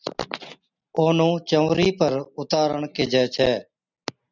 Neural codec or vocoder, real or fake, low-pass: none; real; 7.2 kHz